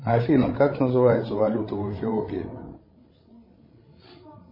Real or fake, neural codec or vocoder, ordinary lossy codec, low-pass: fake; codec, 16 kHz, 16 kbps, FreqCodec, larger model; MP3, 24 kbps; 5.4 kHz